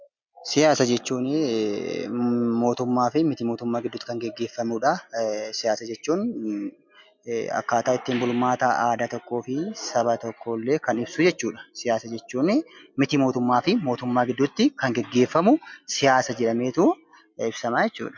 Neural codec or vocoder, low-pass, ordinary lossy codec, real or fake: none; 7.2 kHz; MP3, 64 kbps; real